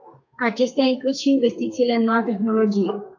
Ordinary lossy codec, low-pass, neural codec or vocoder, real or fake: AAC, 48 kbps; 7.2 kHz; codec, 32 kHz, 1.9 kbps, SNAC; fake